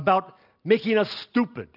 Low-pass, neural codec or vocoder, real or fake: 5.4 kHz; none; real